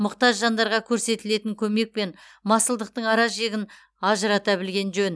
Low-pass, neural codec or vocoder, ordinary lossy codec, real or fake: none; none; none; real